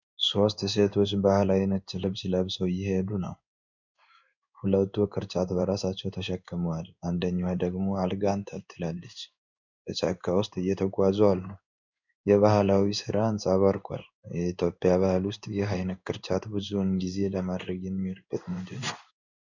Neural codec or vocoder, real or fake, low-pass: codec, 16 kHz in and 24 kHz out, 1 kbps, XY-Tokenizer; fake; 7.2 kHz